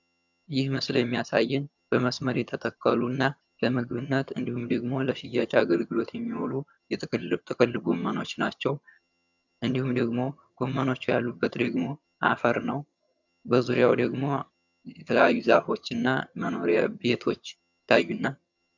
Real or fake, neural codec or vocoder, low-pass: fake; vocoder, 22.05 kHz, 80 mel bands, HiFi-GAN; 7.2 kHz